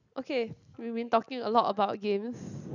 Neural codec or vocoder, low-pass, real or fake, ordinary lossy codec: none; 7.2 kHz; real; none